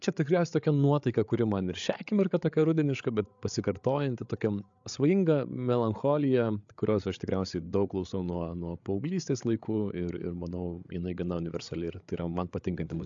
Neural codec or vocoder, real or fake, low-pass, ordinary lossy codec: codec, 16 kHz, 8 kbps, FreqCodec, larger model; fake; 7.2 kHz; AAC, 64 kbps